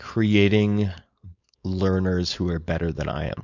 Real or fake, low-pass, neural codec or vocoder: fake; 7.2 kHz; codec, 16 kHz, 4.8 kbps, FACodec